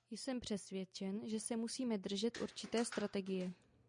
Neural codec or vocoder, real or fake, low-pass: none; real; 9.9 kHz